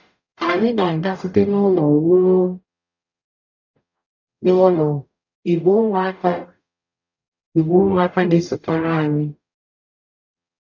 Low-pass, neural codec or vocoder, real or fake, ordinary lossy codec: 7.2 kHz; codec, 44.1 kHz, 0.9 kbps, DAC; fake; none